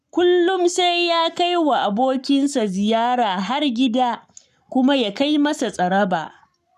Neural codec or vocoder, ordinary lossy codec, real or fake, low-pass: codec, 44.1 kHz, 7.8 kbps, Pupu-Codec; none; fake; 14.4 kHz